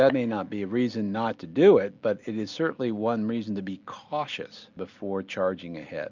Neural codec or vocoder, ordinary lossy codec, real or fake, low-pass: none; MP3, 64 kbps; real; 7.2 kHz